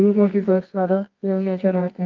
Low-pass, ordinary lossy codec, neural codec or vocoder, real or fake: 7.2 kHz; Opus, 24 kbps; codec, 24 kHz, 0.9 kbps, WavTokenizer, medium music audio release; fake